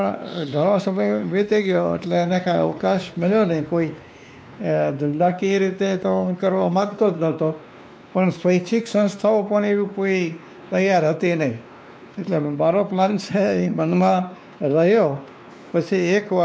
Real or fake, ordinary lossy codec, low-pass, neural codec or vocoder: fake; none; none; codec, 16 kHz, 2 kbps, X-Codec, WavLM features, trained on Multilingual LibriSpeech